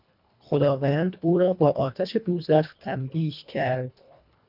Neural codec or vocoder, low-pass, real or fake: codec, 24 kHz, 1.5 kbps, HILCodec; 5.4 kHz; fake